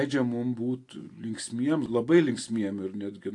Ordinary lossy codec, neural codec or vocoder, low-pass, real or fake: AAC, 48 kbps; none; 10.8 kHz; real